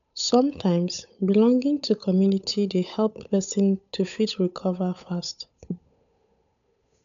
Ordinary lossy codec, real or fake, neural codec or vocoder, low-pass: none; fake; codec, 16 kHz, 8 kbps, FunCodec, trained on Chinese and English, 25 frames a second; 7.2 kHz